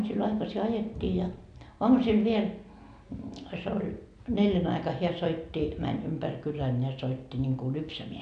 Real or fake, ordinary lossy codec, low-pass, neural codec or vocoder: real; none; 9.9 kHz; none